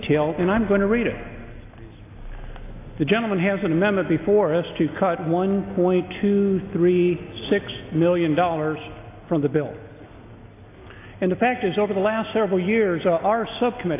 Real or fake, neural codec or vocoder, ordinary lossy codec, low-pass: real; none; AAC, 24 kbps; 3.6 kHz